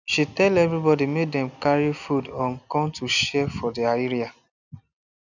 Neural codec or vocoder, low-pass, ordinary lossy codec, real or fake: none; 7.2 kHz; none; real